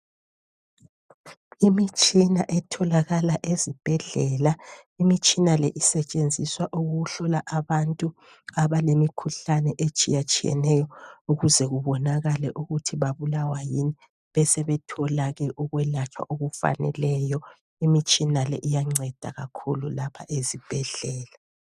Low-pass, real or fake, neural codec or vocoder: 14.4 kHz; real; none